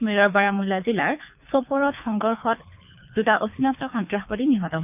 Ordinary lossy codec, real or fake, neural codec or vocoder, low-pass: none; fake; codec, 24 kHz, 3 kbps, HILCodec; 3.6 kHz